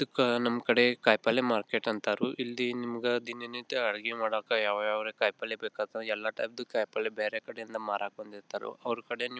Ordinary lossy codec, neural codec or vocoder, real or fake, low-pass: none; none; real; none